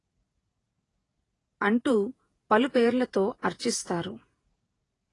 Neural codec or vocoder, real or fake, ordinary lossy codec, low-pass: vocoder, 48 kHz, 128 mel bands, Vocos; fake; AAC, 32 kbps; 10.8 kHz